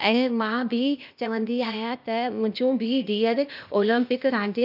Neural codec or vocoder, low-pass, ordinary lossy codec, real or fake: codec, 16 kHz, 0.8 kbps, ZipCodec; 5.4 kHz; none; fake